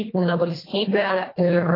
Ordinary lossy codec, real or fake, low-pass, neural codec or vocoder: AAC, 24 kbps; fake; 5.4 kHz; codec, 24 kHz, 1.5 kbps, HILCodec